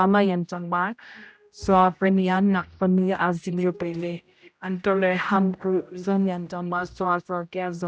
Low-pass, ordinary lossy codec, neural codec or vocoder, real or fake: none; none; codec, 16 kHz, 0.5 kbps, X-Codec, HuBERT features, trained on general audio; fake